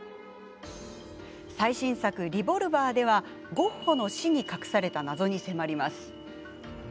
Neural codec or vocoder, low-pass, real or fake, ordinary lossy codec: none; none; real; none